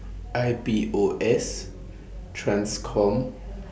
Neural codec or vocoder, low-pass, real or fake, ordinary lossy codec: none; none; real; none